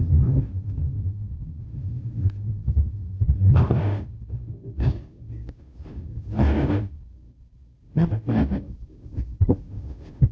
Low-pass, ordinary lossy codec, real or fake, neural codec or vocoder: none; none; fake; codec, 16 kHz, 0.5 kbps, FunCodec, trained on Chinese and English, 25 frames a second